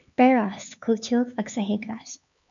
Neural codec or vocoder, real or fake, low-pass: codec, 16 kHz, 4 kbps, X-Codec, HuBERT features, trained on LibriSpeech; fake; 7.2 kHz